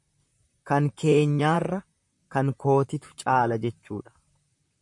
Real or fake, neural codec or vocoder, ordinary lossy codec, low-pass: fake; vocoder, 44.1 kHz, 128 mel bands, Pupu-Vocoder; MP3, 64 kbps; 10.8 kHz